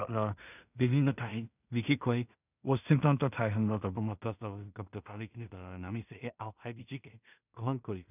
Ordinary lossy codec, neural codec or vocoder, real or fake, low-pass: none; codec, 16 kHz in and 24 kHz out, 0.4 kbps, LongCat-Audio-Codec, two codebook decoder; fake; 3.6 kHz